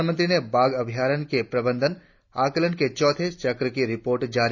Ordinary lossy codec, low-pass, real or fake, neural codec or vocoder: none; 7.2 kHz; real; none